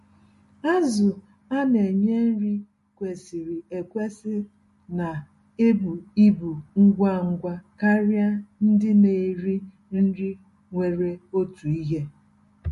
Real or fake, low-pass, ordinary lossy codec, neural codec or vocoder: real; 14.4 kHz; MP3, 48 kbps; none